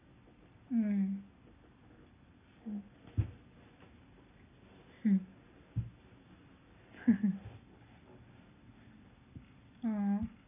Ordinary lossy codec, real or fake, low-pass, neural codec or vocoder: none; real; 3.6 kHz; none